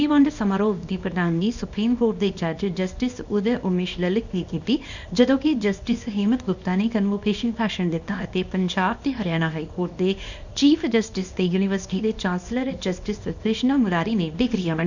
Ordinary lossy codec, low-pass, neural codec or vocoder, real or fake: none; 7.2 kHz; codec, 24 kHz, 0.9 kbps, WavTokenizer, small release; fake